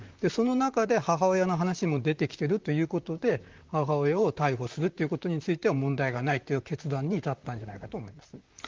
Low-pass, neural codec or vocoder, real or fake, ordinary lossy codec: 7.2 kHz; vocoder, 44.1 kHz, 128 mel bands, Pupu-Vocoder; fake; Opus, 32 kbps